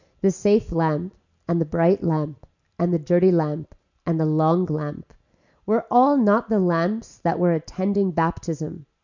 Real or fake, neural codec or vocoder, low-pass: real; none; 7.2 kHz